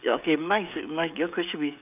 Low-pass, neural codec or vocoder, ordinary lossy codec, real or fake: 3.6 kHz; codec, 16 kHz, 16 kbps, FreqCodec, smaller model; none; fake